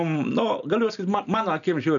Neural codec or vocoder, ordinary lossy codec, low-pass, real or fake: none; MP3, 96 kbps; 7.2 kHz; real